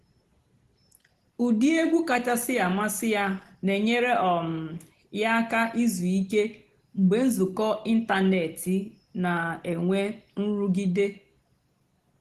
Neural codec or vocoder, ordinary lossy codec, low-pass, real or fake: none; Opus, 16 kbps; 14.4 kHz; real